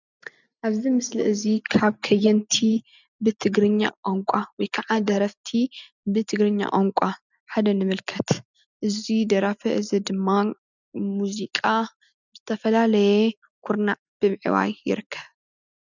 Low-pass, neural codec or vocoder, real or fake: 7.2 kHz; none; real